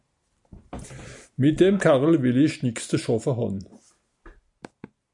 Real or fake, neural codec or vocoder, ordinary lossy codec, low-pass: real; none; MP3, 96 kbps; 10.8 kHz